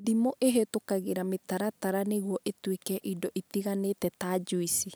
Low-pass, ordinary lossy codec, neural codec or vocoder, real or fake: none; none; none; real